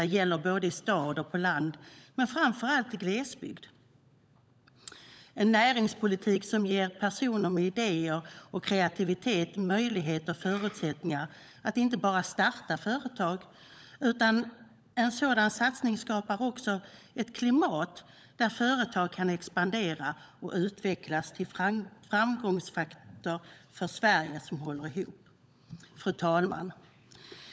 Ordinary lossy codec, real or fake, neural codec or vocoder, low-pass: none; fake; codec, 16 kHz, 16 kbps, FunCodec, trained on LibriTTS, 50 frames a second; none